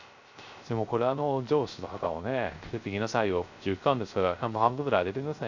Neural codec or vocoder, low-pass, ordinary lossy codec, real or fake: codec, 16 kHz, 0.3 kbps, FocalCodec; 7.2 kHz; none; fake